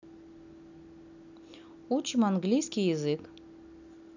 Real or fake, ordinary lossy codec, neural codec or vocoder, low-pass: real; none; none; 7.2 kHz